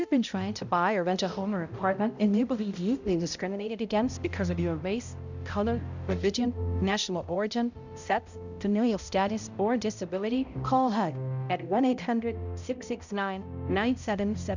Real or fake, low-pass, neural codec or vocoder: fake; 7.2 kHz; codec, 16 kHz, 0.5 kbps, X-Codec, HuBERT features, trained on balanced general audio